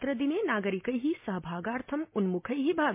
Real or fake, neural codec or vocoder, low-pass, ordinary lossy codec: real; none; 3.6 kHz; MP3, 24 kbps